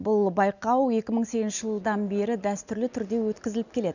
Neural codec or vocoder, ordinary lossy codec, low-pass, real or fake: none; none; 7.2 kHz; real